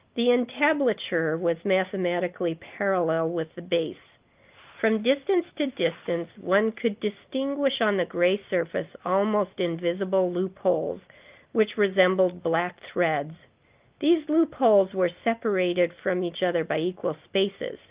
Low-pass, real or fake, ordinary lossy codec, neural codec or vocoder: 3.6 kHz; real; Opus, 32 kbps; none